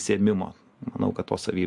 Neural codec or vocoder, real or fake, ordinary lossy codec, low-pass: none; real; MP3, 64 kbps; 10.8 kHz